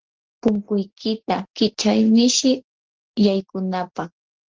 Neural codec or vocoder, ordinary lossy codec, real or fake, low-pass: none; Opus, 16 kbps; real; 7.2 kHz